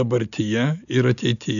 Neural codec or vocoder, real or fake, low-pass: none; real; 7.2 kHz